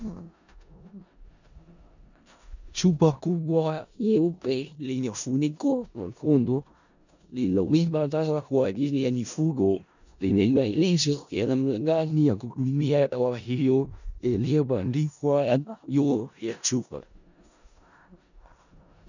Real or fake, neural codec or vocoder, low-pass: fake; codec, 16 kHz in and 24 kHz out, 0.4 kbps, LongCat-Audio-Codec, four codebook decoder; 7.2 kHz